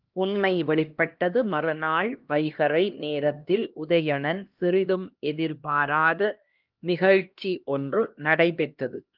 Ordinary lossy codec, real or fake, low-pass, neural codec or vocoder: Opus, 24 kbps; fake; 5.4 kHz; codec, 16 kHz, 1 kbps, X-Codec, HuBERT features, trained on LibriSpeech